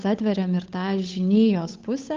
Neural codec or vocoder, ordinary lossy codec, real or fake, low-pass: codec, 16 kHz, 8 kbps, FunCodec, trained on Chinese and English, 25 frames a second; Opus, 24 kbps; fake; 7.2 kHz